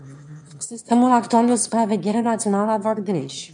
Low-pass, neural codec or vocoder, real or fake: 9.9 kHz; autoencoder, 22.05 kHz, a latent of 192 numbers a frame, VITS, trained on one speaker; fake